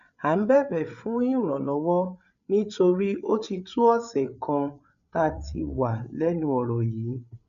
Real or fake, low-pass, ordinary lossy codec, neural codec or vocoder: fake; 7.2 kHz; none; codec, 16 kHz, 8 kbps, FreqCodec, larger model